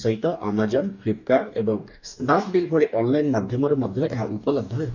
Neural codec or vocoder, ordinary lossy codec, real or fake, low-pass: codec, 44.1 kHz, 2.6 kbps, DAC; none; fake; 7.2 kHz